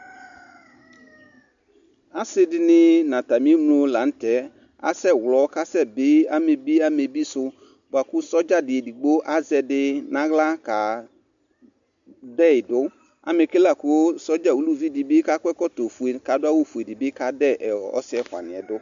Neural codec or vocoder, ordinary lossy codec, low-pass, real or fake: none; MP3, 64 kbps; 7.2 kHz; real